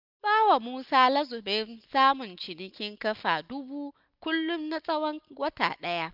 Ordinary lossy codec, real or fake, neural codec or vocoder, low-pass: none; real; none; 5.4 kHz